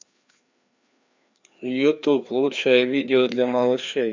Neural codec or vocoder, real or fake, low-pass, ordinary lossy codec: codec, 16 kHz, 2 kbps, FreqCodec, larger model; fake; 7.2 kHz; MP3, 64 kbps